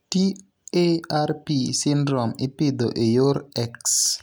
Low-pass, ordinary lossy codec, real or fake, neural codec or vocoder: none; none; real; none